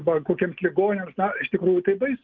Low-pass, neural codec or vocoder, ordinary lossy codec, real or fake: 7.2 kHz; none; Opus, 32 kbps; real